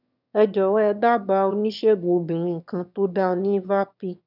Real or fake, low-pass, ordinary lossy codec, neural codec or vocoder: fake; 5.4 kHz; none; autoencoder, 22.05 kHz, a latent of 192 numbers a frame, VITS, trained on one speaker